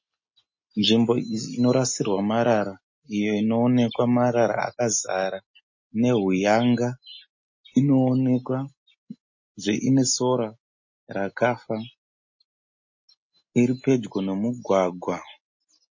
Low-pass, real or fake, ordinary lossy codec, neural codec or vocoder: 7.2 kHz; real; MP3, 32 kbps; none